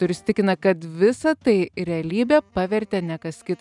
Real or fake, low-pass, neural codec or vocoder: real; 10.8 kHz; none